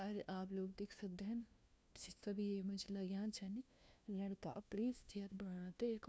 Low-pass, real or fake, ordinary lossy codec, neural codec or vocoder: none; fake; none; codec, 16 kHz, 1 kbps, FunCodec, trained on LibriTTS, 50 frames a second